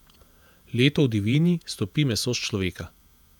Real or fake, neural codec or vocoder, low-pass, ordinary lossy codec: fake; vocoder, 48 kHz, 128 mel bands, Vocos; 19.8 kHz; none